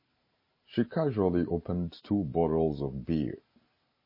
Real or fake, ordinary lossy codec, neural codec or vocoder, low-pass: real; MP3, 24 kbps; none; 5.4 kHz